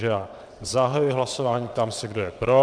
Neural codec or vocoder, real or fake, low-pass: vocoder, 22.05 kHz, 80 mel bands, WaveNeXt; fake; 9.9 kHz